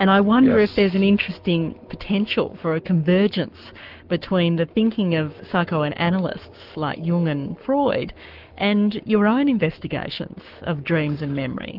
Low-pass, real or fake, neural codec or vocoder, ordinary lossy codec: 5.4 kHz; fake; codec, 44.1 kHz, 7.8 kbps, Pupu-Codec; Opus, 32 kbps